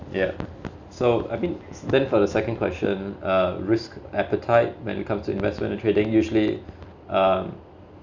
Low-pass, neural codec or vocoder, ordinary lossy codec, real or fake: 7.2 kHz; none; none; real